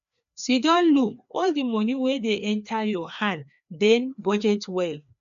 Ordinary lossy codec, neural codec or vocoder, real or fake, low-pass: none; codec, 16 kHz, 2 kbps, FreqCodec, larger model; fake; 7.2 kHz